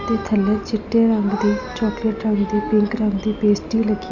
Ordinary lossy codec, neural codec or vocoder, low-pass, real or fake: none; none; 7.2 kHz; real